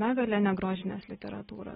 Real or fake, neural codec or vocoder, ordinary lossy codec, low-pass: real; none; AAC, 16 kbps; 19.8 kHz